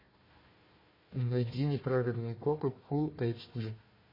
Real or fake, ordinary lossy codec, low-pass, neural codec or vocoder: fake; MP3, 24 kbps; 5.4 kHz; codec, 16 kHz, 1 kbps, FunCodec, trained on Chinese and English, 50 frames a second